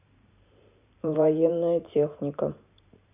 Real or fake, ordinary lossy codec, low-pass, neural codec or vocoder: fake; none; 3.6 kHz; vocoder, 44.1 kHz, 128 mel bands every 512 samples, BigVGAN v2